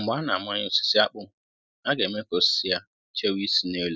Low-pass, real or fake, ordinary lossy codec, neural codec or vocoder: none; real; none; none